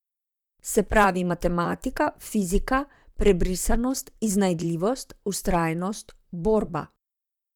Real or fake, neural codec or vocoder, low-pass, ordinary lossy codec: fake; vocoder, 44.1 kHz, 128 mel bands, Pupu-Vocoder; 19.8 kHz; none